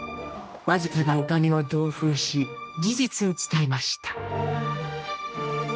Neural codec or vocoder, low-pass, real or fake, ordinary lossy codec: codec, 16 kHz, 1 kbps, X-Codec, HuBERT features, trained on general audio; none; fake; none